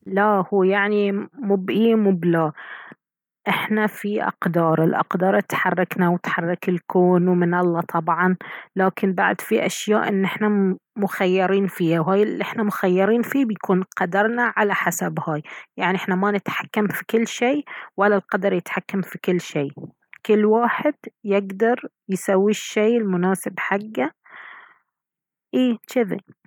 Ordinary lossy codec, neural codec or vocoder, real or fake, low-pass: none; none; real; 19.8 kHz